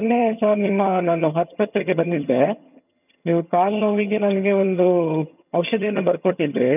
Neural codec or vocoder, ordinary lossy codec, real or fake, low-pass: vocoder, 22.05 kHz, 80 mel bands, HiFi-GAN; none; fake; 3.6 kHz